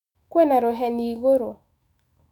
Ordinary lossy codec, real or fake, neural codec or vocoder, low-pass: none; fake; autoencoder, 48 kHz, 128 numbers a frame, DAC-VAE, trained on Japanese speech; 19.8 kHz